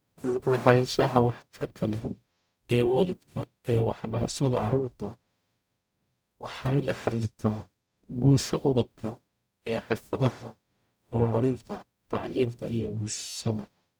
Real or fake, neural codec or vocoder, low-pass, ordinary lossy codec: fake; codec, 44.1 kHz, 0.9 kbps, DAC; none; none